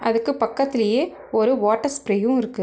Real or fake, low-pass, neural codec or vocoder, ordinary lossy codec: real; none; none; none